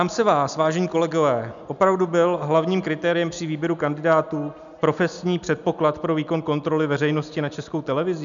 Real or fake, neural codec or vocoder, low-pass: real; none; 7.2 kHz